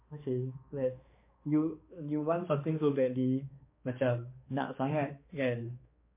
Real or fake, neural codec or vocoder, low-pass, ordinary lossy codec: fake; codec, 16 kHz, 2 kbps, X-Codec, HuBERT features, trained on balanced general audio; 3.6 kHz; MP3, 24 kbps